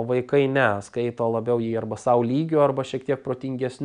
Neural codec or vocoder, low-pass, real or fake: none; 9.9 kHz; real